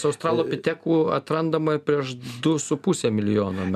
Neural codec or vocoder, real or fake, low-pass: none; real; 14.4 kHz